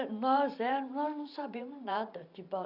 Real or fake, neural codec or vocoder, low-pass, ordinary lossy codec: fake; vocoder, 44.1 kHz, 128 mel bands every 512 samples, BigVGAN v2; 5.4 kHz; none